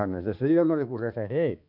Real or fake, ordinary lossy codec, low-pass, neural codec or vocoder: fake; none; 5.4 kHz; codec, 16 kHz, 2 kbps, X-Codec, HuBERT features, trained on balanced general audio